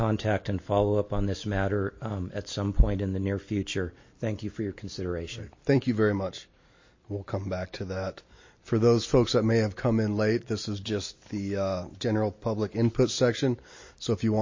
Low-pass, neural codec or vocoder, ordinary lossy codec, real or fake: 7.2 kHz; none; MP3, 32 kbps; real